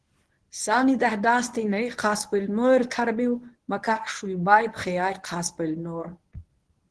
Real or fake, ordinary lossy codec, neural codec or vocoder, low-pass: fake; Opus, 16 kbps; codec, 24 kHz, 0.9 kbps, WavTokenizer, medium speech release version 1; 10.8 kHz